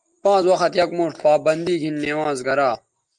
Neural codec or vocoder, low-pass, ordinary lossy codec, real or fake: none; 10.8 kHz; Opus, 32 kbps; real